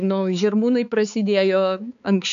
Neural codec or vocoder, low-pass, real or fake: codec, 16 kHz, 4 kbps, X-Codec, HuBERT features, trained on balanced general audio; 7.2 kHz; fake